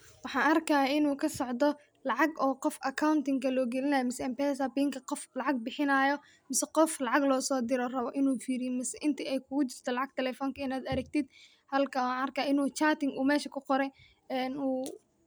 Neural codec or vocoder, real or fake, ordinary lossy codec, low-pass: none; real; none; none